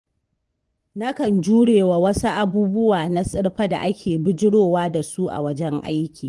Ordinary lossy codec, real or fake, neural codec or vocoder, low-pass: Opus, 24 kbps; fake; vocoder, 24 kHz, 100 mel bands, Vocos; 10.8 kHz